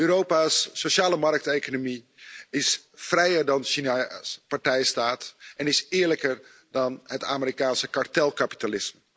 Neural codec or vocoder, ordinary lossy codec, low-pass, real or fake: none; none; none; real